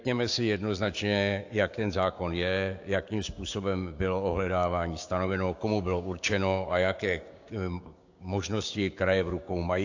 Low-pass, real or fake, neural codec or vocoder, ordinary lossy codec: 7.2 kHz; fake; codec, 16 kHz, 6 kbps, DAC; AAC, 48 kbps